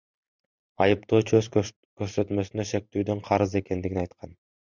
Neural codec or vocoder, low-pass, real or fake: vocoder, 44.1 kHz, 128 mel bands every 512 samples, BigVGAN v2; 7.2 kHz; fake